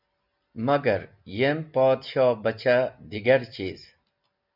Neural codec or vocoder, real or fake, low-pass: none; real; 5.4 kHz